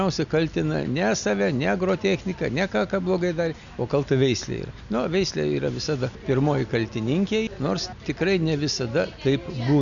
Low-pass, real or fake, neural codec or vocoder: 7.2 kHz; real; none